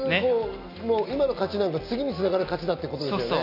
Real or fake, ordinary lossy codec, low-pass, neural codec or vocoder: real; none; 5.4 kHz; none